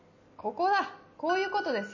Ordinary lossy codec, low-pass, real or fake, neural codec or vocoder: MP3, 32 kbps; 7.2 kHz; real; none